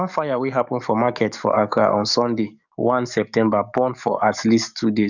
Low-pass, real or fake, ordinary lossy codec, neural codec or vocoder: 7.2 kHz; fake; none; codec, 44.1 kHz, 7.8 kbps, DAC